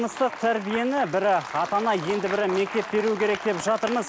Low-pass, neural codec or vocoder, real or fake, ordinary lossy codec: none; none; real; none